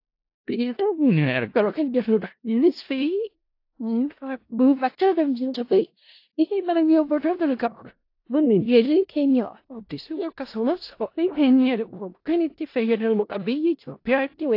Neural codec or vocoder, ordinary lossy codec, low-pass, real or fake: codec, 16 kHz in and 24 kHz out, 0.4 kbps, LongCat-Audio-Codec, four codebook decoder; AAC, 32 kbps; 5.4 kHz; fake